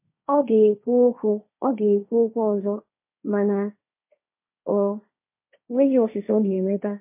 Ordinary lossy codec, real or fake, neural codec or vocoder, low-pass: MP3, 24 kbps; fake; codec, 16 kHz, 1.1 kbps, Voila-Tokenizer; 3.6 kHz